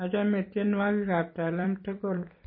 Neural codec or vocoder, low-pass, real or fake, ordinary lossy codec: vocoder, 44.1 kHz, 128 mel bands every 256 samples, BigVGAN v2; 5.4 kHz; fake; MP3, 24 kbps